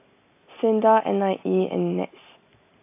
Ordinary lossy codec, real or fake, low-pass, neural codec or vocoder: AAC, 24 kbps; real; 3.6 kHz; none